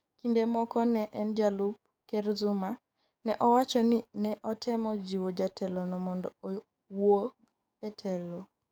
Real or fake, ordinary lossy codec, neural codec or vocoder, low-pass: fake; none; codec, 44.1 kHz, 7.8 kbps, DAC; none